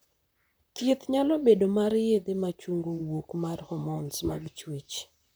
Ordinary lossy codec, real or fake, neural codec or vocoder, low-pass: none; fake; vocoder, 44.1 kHz, 128 mel bands, Pupu-Vocoder; none